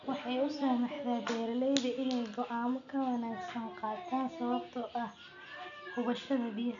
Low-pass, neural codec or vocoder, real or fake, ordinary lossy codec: 7.2 kHz; none; real; none